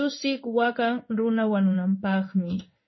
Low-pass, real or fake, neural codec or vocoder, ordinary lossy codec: 7.2 kHz; fake; codec, 16 kHz in and 24 kHz out, 1 kbps, XY-Tokenizer; MP3, 24 kbps